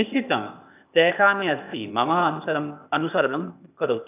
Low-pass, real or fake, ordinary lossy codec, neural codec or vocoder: 3.6 kHz; fake; none; codec, 16 kHz, 0.8 kbps, ZipCodec